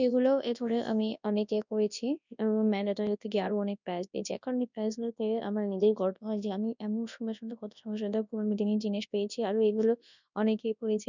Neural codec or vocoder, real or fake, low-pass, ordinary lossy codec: codec, 24 kHz, 0.9 kbps, WavTokenizer, large speech release; fake; 7.2 kHz; none